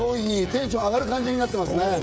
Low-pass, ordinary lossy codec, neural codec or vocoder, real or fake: none; none; codec, 16 kHz, 16 kbps, FreqCodec, smaller model; fake